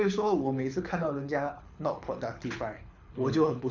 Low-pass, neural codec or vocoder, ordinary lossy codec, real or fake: 7.2 kHz; codec, 24 kHz, 6 kbps, HILCodec; none; fake